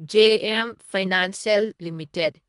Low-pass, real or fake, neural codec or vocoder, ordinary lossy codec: 10.8 kHz; fake; codec, 24 kHz, 1.5 kbps, HILCodec; none